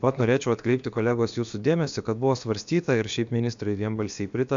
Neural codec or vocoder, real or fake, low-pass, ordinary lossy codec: codec, 16 kHz, about 1 kbps, DyCAST, with the encoder's durations; fake; 7.2 kHz; MP3, 64 kbps